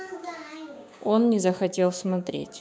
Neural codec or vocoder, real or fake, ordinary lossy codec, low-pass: codec, 16 kHz, 6 kbps, DAC; fake; none; none